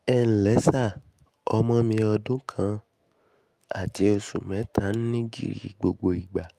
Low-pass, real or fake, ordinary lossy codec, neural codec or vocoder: 14.4 kHz; fake; Opus, 32 kbps; autoencoder, 48 kHz, 128 numbers a frame, DAC-VAE, trained on Japanese speech